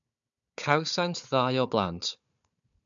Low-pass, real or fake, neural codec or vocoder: 7.2 kHz; fake; codec, 16 kHz, 4 kbps, FunCodec, trained on Chinese and English, 50 frames a second